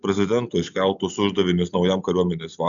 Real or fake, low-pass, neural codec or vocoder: real; 7.2 kHz; none